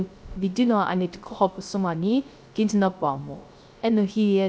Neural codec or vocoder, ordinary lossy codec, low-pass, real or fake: codec, 16 kHz, 0.3 kbps, FocalCodec; none; none; fake